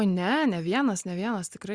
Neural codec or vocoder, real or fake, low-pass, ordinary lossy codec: none; real; 9.9 kHz; Opus, 64 kbps